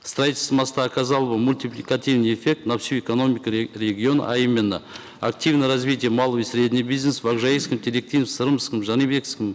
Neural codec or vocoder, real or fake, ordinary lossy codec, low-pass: none; real; none; none